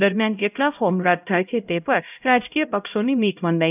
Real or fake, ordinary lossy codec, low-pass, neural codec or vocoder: fake; none; 3.6 kHz; codec, 16 kHz, 0.5 kbps, X-Codec, HuBERT features, trained on LibriSpeech